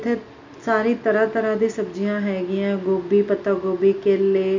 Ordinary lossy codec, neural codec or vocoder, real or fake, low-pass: MP3, 64 kbps; none; real; 7.2 kHz